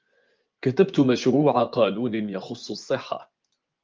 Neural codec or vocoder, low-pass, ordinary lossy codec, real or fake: vocoder, 24 kHz, 100 mel bands, Vocos; 7.2 kHz; Opus, 32 kbps; fake